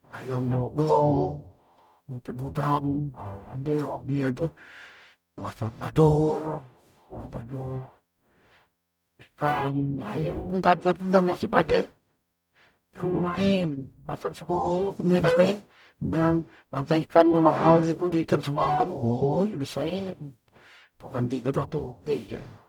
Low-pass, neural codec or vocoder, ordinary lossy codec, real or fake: 19.8 kHz; codec, 44.1 kHz, 0.9 kbps, DAC; none; fake